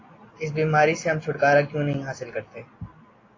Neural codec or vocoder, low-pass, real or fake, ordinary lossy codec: none; 7.2 kHz; real; AAC, 32 kbps